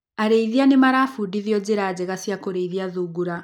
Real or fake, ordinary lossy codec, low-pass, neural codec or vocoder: real; none; 19.8 kHz; none